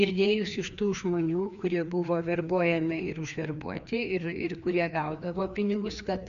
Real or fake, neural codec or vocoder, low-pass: fake; codec, 16 kHz, 2 kbps, FreqCodec, larger model; 7.2 kHz